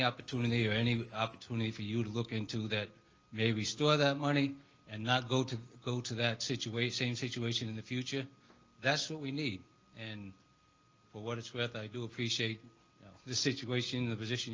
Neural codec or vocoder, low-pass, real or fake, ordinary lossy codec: none; 7.2 kHz; real; Opus, 24 kbps